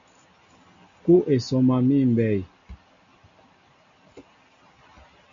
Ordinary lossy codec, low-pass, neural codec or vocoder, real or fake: MP3, 64 kbps; 7.2 kHz; none; real